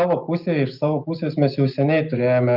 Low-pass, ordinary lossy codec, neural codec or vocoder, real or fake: 5.4 kHz; Opus, 24 kbps; none; real